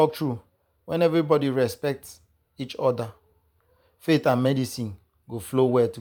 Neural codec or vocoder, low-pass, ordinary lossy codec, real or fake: vocoder, 44.1 kHz, 128 mel bands every 512 samples, BigVGAN v2; 19.8 kHz; none; fake